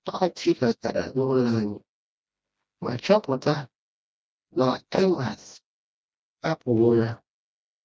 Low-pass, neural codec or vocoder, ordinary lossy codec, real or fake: none; codec, 16 kHz, 1 kbps, FreqCodec, smaller model; none; fake